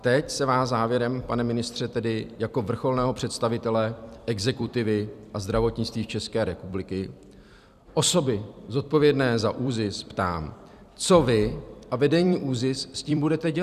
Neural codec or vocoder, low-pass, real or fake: vocoder, 44.1 kHz, 128 mel bands every 256 samples, BigVGAN v2; 14.4 kHz; fake